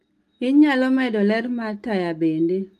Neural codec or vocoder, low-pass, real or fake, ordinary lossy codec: none; 14.4 kHz; real; Opus, 32 kbps